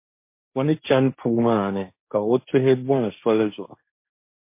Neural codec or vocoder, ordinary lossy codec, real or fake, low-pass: codec, 16 kHz, 1.1 kbps, Voila-Tokenizer; MP3, 24 kbps; fake; 3.6 kHz